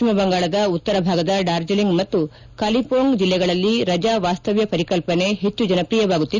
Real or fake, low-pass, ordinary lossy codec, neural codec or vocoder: real; 7.2 kHz; Opus, 64 kbps; none